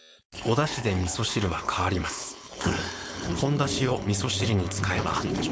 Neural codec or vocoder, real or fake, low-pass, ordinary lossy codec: codec, 16 kHz, 4.8 kbps, FACodec; fake; none; none